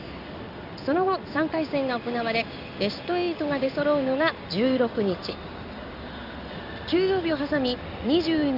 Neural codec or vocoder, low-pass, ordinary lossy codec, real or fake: codec, 16 kHz in and 24 kHz out, 1 kbps, XY-Tokenizer; 5.4 kHz; none; fake